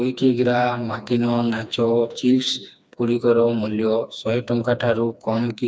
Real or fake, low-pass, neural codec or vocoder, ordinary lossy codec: fake; none; codec, 16 kHz, 2 kbps, FreqCodec, smaller model; none